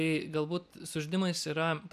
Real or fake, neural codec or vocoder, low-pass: real; none; 14.4 kHz